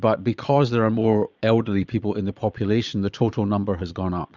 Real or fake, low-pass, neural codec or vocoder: fake; 7.2 kHz; vocoder, 22.05 kHz, 80 mel bands, Vocos